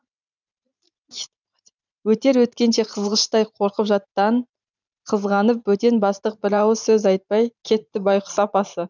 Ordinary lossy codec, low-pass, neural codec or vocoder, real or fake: none; 7.2 kHz; vocoder, 44.1 kHz, 128 mel bands every 512 samples, BigVGAN v2; fake